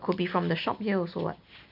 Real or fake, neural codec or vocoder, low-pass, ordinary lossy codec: real; none; 5.4 kHz; MP3, 48 kbps